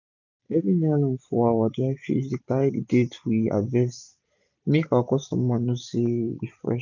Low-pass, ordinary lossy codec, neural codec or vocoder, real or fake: 7.2 kHz; none; none; real